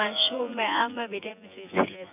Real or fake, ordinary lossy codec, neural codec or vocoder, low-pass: fake; none; vocoder, 24 kHz, 100 mel bands, Vocos; 3.6 kHz